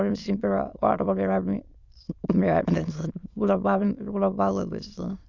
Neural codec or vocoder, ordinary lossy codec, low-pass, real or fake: autoencoder, 22.05 kHz, a latent of 192 numbers a frame, VITS, trained on many speakers; Opus, 64 kbps; 7.2 kHz; fake